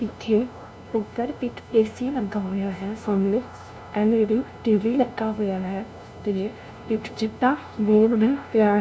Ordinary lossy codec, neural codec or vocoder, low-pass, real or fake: none; codec, 16 kHz, 0.5 kbps, FunCodec, trained on LibriTTS, 25 frames a second; none; fake